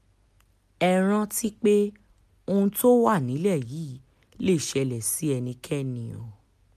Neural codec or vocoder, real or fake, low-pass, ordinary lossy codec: none; real; 14.4 kHz; MP3, 96 kbps